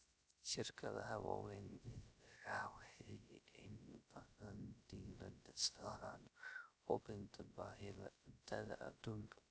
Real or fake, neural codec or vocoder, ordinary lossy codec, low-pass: fake; codec, 16 kHz, 0.3 kbps, FocalCodec; none; none